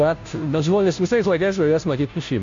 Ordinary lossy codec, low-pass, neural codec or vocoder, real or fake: MP3, 48 kbps; 7.2 kHz; codec, 16 kHz, 0.5 kbps, FunCodec, trained on Chinese and English, 25 frames a second; fake